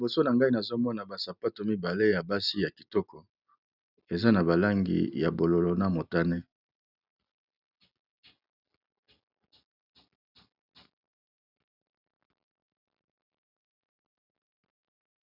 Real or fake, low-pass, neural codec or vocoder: real; 5.4 kHz; none